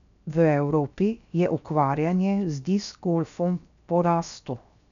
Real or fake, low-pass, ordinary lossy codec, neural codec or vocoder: fake; 7.2 kHz; none; codec, 16 kHz, 0.7 kbps, FocalCodec